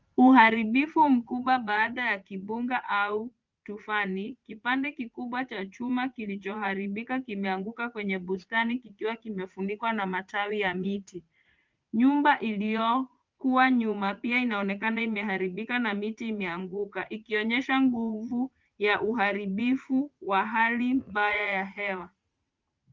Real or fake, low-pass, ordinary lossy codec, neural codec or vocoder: fake; 7.2 kHz; Opus, 32 kbps; vocoder, 44.1 kHz, 80 mel bands, Vocos